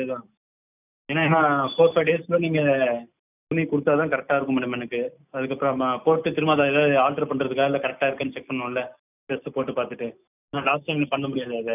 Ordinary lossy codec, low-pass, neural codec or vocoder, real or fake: none; 3.6 kHz; none; real